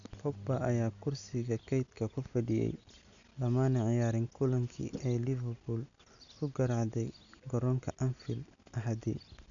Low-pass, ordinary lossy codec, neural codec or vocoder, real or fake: 7.2 kHz; none; none; real